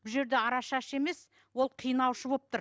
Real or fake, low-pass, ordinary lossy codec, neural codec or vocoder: real; none; none; none